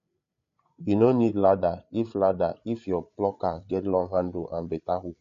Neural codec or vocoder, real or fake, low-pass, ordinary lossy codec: codec, 16 kHz, 8 kbps, FreqCodec, larger model; fake; 7.2 kHz; MP3, 48 kbps